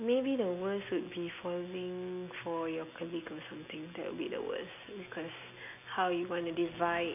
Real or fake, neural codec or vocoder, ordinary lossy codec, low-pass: real; none; none; 3.6 kHz